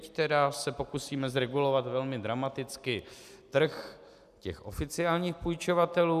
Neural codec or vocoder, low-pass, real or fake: none; 14.4 kHz; real